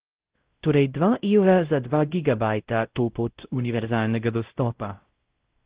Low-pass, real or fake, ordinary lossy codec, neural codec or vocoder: 3.6 kHz; fake; Opus, 16 kbps; codec, 16 kHz, 0.5 kbps, X-Codec, WavLM features, trained on Multilingual LibriSpeech